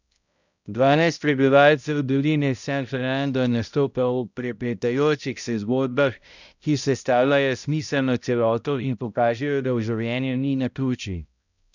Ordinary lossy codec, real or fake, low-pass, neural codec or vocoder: none; fake; 7.2 kHz; codec, 16 kHz, 0.5 kbps, X-Codec, HuBERT features, trained on balanced general audio